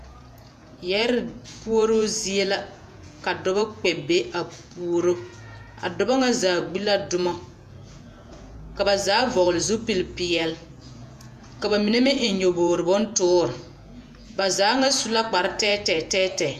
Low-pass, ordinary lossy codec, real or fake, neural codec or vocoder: 14.4 kHz; MP3, 96 kbps; fake; vocoder, 48 kHz, 128 mel bands, Vocos